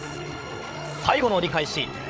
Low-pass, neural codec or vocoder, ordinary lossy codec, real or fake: none; codec, 16 kHz, 16 kbps, FreqCodec, larger model; none; fake